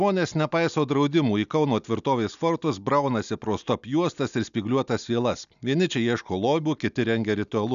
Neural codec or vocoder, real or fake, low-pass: none; real; 7.2 kHz